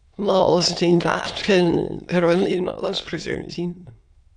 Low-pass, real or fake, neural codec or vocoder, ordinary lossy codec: 9.9 kHz; fake; autoencoder, 22.05 kHz, a latent of 192 numbers a frame, VITS, trained on many speakers; AAC, 64 kbps